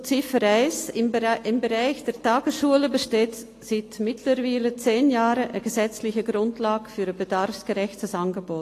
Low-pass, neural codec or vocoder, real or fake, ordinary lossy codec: 14.4 kHz; none; real; AAC, 48 kbps